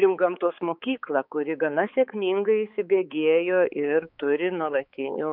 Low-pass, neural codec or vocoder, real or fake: 5.4 kHz; codec, 16 kHz, 4 kbps, X-Codec, HuBERT features, trained on balanced general audio; fake